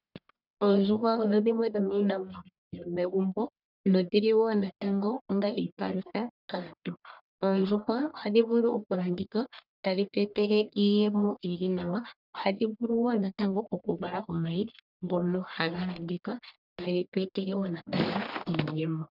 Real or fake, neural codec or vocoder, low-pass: fake; codec, 44.1 kHz, 1.7 kbps, Pupu-Codec; 5.4 kHz